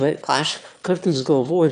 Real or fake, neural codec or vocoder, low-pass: fake; autoencoder, 22.05 kHz, a latent of 192 numbers a frame, VITS, trained on one speaker; 9.9 kHz